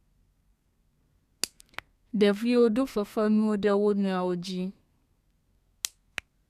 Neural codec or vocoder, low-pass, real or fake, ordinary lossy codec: codec, 32 kHz, 1.9 kbps, SNAC; 14.4 kHz; fake; none